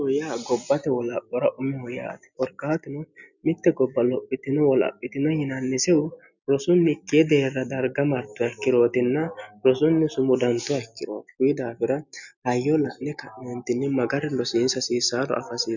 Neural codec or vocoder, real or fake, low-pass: none; real; 7.2 kHz